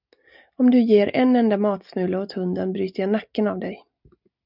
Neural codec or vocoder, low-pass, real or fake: none; 5.4 kHz; real